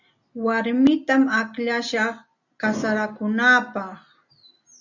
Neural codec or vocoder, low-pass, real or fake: none; 7.2 kHz; real